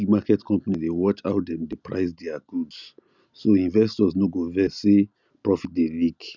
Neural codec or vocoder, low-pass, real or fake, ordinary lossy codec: none; 7.2 kHz; real; none